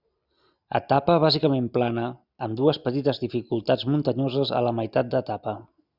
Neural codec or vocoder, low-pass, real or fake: none; 5.4 kHz; real